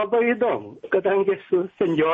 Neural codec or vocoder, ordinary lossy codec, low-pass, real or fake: none; MP3, 32 kbps; 10.8 kHz; real